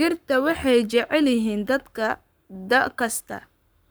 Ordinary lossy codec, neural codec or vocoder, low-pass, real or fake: none; codec, 44.1 kHz, 7.8 kbps, Pupu-Codec; none; fake